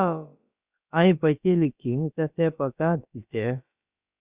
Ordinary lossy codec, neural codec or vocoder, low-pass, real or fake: Opus, 64 kbps; codec, 16 kHz, about 1 kbps, DyCAST, with the encoder's durations; 3.6 kHz; fake